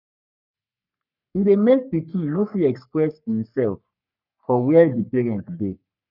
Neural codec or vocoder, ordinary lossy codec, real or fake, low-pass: codec, 44.1 kHz, 3.4 kbps, Pupu-Codec; none; fake; 5.4 kHz